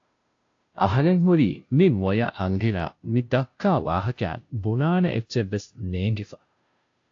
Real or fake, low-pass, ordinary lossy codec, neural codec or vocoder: fake; 7.2 kHz; AAC, 48 kbps; codec, 16 kHz, 0.5 kbps, FunCodec, trained on Chinese and English, 25 frames a second